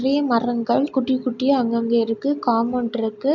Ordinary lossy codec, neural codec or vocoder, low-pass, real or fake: none; none; 7.2 kHz; real